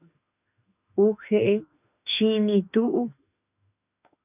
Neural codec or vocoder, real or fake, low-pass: autoencoder, 48 kHz, 32 numbers a frame, DAC-VAE, trained on Japanese speech; fake; 3.6 kHz